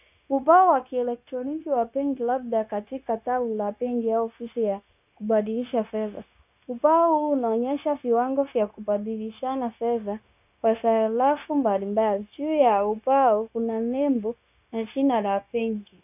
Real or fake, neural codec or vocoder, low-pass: fake; codec, 16 kHz, 0.9 kbps, LongCat-Audio-Codec; 3.6 kHz